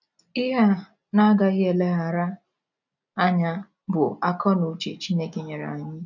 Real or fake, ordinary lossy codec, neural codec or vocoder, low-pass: real; none; none; 7.2 kHz